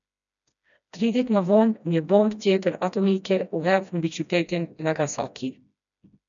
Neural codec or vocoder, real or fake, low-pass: codec, 16 kHz, 1 kbps, FreqCodec, smaller model; fake; 7.2 kHz